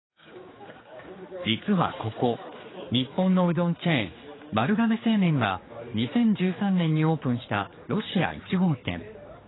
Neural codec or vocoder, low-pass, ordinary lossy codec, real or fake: codec, 16 kHz, 4 kbps, X-Codec, HuBERT features, trained on general audio; 7.2 kHz; AAC, 16 kbps; fake